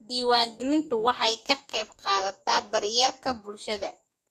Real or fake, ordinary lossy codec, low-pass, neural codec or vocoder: fake; none; 14.4 kHz; codec, 44.1 kHz, 2.6 kbps, DAC